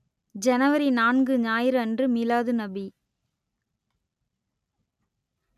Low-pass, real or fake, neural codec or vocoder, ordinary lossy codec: 14.4 kHz; real; none; none